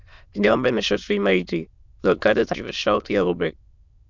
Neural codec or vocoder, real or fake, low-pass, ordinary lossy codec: autoencoder, 22.05 kHz, a latent of 192 numbers a frame, VITS, trained on many speakers; fake; 7.2 kHz; Opus, 64 kbps